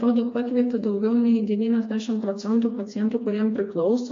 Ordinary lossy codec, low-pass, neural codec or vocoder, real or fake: AAC, 48 kbps; 7.2 kHz; codec, 16 kHz, 2 kbps, FreqCodec, smaller model; fake